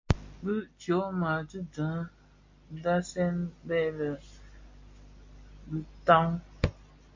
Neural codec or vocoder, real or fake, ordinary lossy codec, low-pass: none; real; MP3, 48 kbps; 7.2 kHz